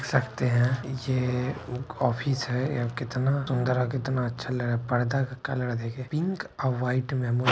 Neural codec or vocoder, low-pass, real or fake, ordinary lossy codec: none; none; real; none